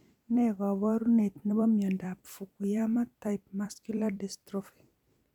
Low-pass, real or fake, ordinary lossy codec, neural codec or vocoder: 19.8 kHz; fake; none; vocoder, 44.1 kHz, 128 mel bands every 256 samples, BigVGAN v2